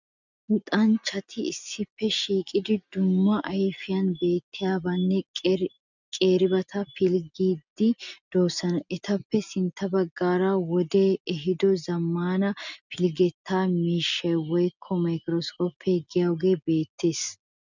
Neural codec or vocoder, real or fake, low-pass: none; real; 7.2 kHz